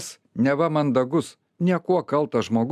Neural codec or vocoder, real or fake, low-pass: none; real; 14.4 kHz